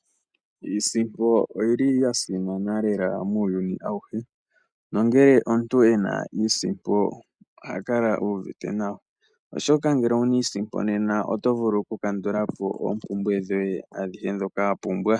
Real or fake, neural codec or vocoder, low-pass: real; none; 9.9 kHz